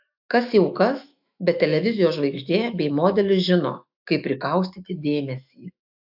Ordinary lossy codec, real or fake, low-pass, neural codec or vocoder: AAC, 48 kbps; fake; 5.4 kHz; autoencoder, 48 kHz, 128 numbers a frame, DAC-VAE, trained on Japanese speech